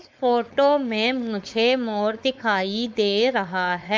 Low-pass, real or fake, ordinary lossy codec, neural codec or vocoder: none; fake; none; codec, 16 kHz, 4.8 kbps, FACodec